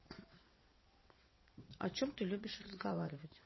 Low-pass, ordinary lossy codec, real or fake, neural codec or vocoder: 7.2 kHz; MP3, 24 kbps; fake; vocoder, 22.05 kHz, 80 mel bands, WaveNeXt